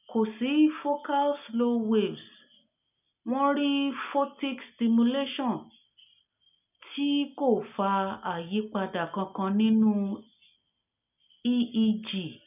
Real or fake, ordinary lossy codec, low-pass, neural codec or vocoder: real; none; 3.6 kHz; none